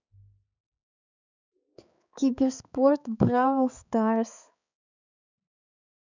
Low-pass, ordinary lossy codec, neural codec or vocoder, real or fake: 7.2 kHz; none; codec, 16 kHz, 4 kbps, X-Codec, HuBERT features, trained on balanced general audio; fake